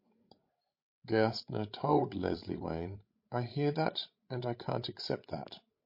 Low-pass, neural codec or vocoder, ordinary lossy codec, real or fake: 5.4 kHz; codec, 16 kHz, 16 kbps, FreqCodec, larger model; MP3, 32 kbps; fake